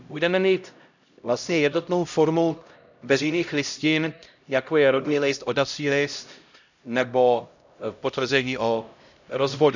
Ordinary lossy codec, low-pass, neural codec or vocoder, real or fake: none; 7.2 kHz; codec, 16 kHz, 0.5 kbps, X-Codec, HuBERT features, trained on LibriSpeech; fake